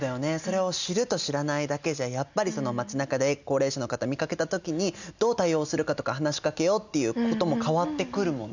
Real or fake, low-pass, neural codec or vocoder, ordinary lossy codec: real; 7.2 kHz; none; none